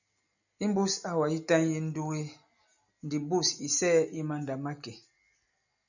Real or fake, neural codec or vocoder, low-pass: real; none; 7.2 kHz